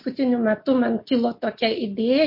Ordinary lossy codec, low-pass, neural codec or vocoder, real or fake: MP3, 32 kbps; 5.4 kHz; none; real